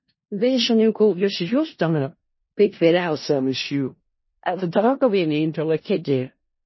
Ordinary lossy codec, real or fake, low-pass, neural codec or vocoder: MP3, 24 kbps; fake; 7.2 kHz; codec, 16 kHz in and 24 kHz out, 0.4 kbps, LongCat-Audio-Codec, four codebook decoder